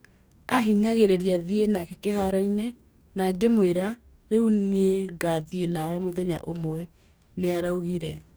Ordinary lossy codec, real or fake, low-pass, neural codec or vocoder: none; fake; none; codec, 44.1 kHz, 2.6 kbps, DAC